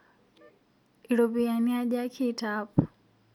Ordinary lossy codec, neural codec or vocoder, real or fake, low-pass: none; none; real; 19.8 kHz